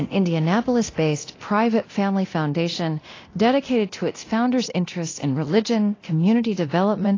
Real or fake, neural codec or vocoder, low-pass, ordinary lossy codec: fake; codec, 24 kHz, 0.9 kbps, DualCodec; 7.2 kHz; AAC, 32 kbps